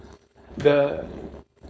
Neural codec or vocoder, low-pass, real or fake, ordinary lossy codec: codec, 16 kHz, 4.8 kbps, FACodec; none; fake; none